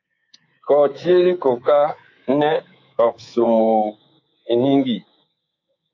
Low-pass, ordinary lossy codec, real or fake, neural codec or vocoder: 7.2 kHz; AAC, 48 kbps; fake; codec, 24 kHz, 3.1 kbps, DualCodec